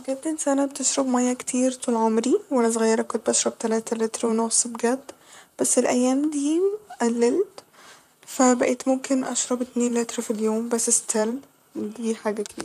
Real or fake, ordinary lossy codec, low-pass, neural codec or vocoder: fake; none; 14.4 kHz; vocoder, 44.1 kHz, 128 mel bands, Pupu-Vocoder